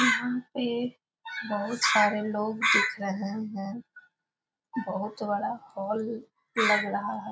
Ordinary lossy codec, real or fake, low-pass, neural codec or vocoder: none; real; none; none